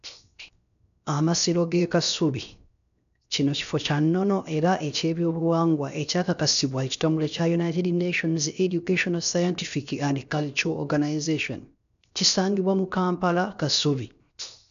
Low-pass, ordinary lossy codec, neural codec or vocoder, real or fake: 7.2 kHz; none; codec, 16 kHz, 0.7 kbps, FocalCodec; fake